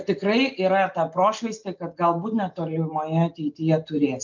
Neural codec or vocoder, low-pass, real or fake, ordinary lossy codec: none; 7.2 kHz; real; MP3, 64 kbps